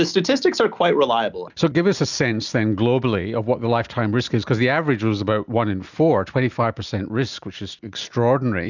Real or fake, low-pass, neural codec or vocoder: real; 7.2 kHz; none